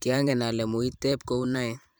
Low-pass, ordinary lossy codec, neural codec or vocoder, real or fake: none; none; vocoder, 44.1 kHz, 128 mel bands every 512 samples, BigVGAN v2; fake